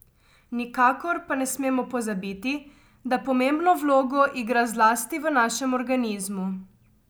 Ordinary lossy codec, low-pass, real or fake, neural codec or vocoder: none; none; real; none